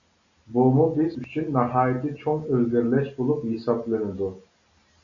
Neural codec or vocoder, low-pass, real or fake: none; 7.2 kHz; real